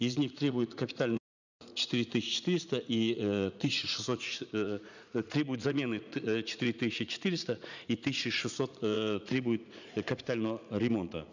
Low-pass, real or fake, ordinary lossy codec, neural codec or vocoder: 7.2 kHz; fake; none; vocoder, 44.1 kHz, 80 mel bands, Vocos